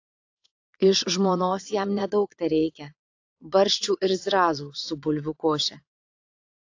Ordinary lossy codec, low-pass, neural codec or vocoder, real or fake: AAC, 48 kbps; 7.2 kHz; vocoder, 22.05 kHz, 80 mel bands, Vocos; fake